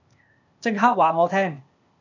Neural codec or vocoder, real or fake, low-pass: codec, 16 kHz, 0.8 kbps, ZipCodec; fake; 7.2 kHz